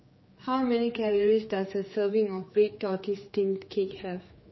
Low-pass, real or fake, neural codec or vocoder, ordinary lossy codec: 7.2 kHz; fake; codec, 16 kHz, 4 kbps, X-Codec, HuBERT features, trained on general audio; MP3, 24 kbps